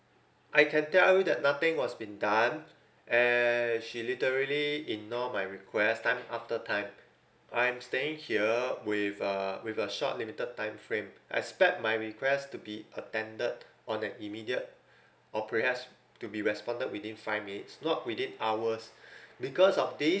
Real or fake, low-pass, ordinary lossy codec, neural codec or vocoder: real; none; none; none